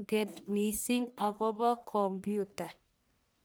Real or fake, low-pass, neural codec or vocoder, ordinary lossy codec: fake; none; codec, 44.1 kHz, 1.7 kbps, Pupu-Codec; none